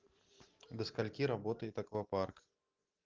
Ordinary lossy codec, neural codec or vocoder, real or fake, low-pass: Opus, 24 kbps; none; real; 7.2 kHz